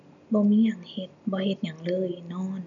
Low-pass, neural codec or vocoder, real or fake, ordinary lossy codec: 7.2 kHz; none; real; none